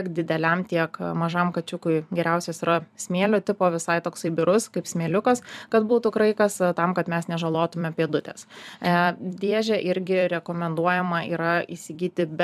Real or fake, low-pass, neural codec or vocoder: fake; 14.4 kHz; vocoder, 44.1 kHz, 128 mel bands every 256 samples, BigVGAN v2